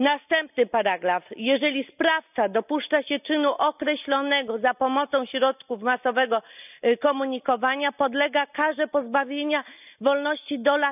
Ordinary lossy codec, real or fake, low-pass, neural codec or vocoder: none; real; 3.6 kHz; none